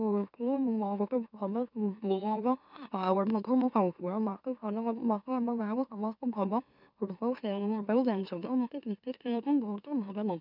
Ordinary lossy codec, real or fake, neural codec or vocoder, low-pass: none; fake; autoencoder, 44.1 kHz, a latent of 192 numbers a frame, MeloTTS; 5.4 kHz